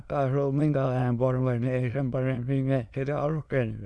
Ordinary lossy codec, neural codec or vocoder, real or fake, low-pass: none; autoencoder, 22.05 kHz, a latent of 192 numbers a frame, VITS, trained on many speakers; fake; none